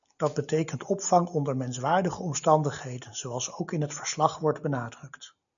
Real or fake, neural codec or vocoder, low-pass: real; none; 7.2 kHz